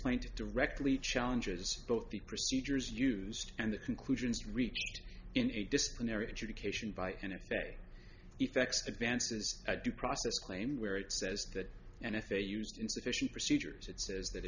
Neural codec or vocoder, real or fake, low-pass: none; real; 7.2 kHz